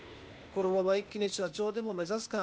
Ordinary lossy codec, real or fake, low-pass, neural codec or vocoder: none; fake; none; codec, 16 kHz, 0.8 kbps, ZipCodec